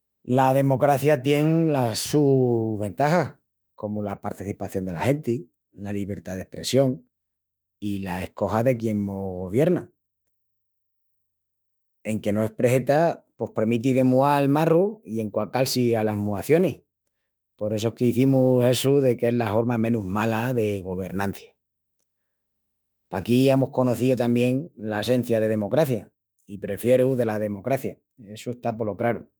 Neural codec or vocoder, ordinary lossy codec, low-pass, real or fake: autoencoder, 48 kHz, 32 numbers a frame, DAC-VAE, trained on Japanese speech; none; none; fake